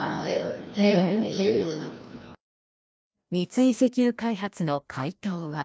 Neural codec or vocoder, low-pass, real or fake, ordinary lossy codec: codec, 16 kHz, 1 kbps, FreqCodec, larger model; none; fake; none